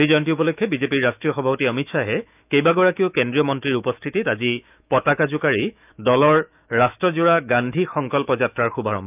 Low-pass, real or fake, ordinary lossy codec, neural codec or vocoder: 3.6 kHz; fake; none; autoencoder, 48 kHz, 128 numbers a frame, DAC-VAE, trained on Japanese speech